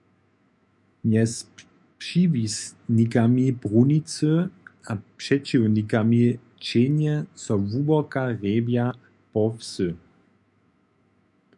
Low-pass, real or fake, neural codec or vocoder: 10.8 kHz; fake; autoencoder, 48 kHz, 128 numbers a frame, DAC-VAE, trained on Japanese speech